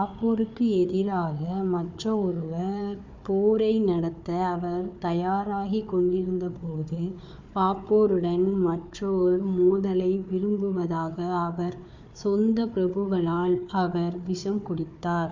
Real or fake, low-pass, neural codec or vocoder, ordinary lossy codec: fake; 7.2 kHz; codec, 16 kHz, 4 kbps, FunCodec, trained on Chinese and English, 50 frames a second; MP3, 64 kbps